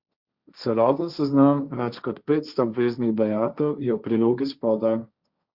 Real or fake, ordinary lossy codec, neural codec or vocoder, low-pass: fake; Opus, 64 kbps; codec, 16 kHz, 1.1 kbps, Voila-Tokenizer; 5.4 kHz